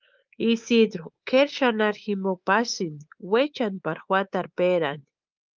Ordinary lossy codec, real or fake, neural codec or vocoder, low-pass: Opus, 32 kbps; fake; codec, 24 kHz, 3.1 kbps, DualCodec; 7.2 kHz